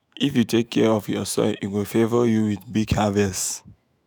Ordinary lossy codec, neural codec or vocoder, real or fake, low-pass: none; autoencoder, 48 kHz, 128 numbers a frame, DAC-VAE, trained on Japanese speech; fake; none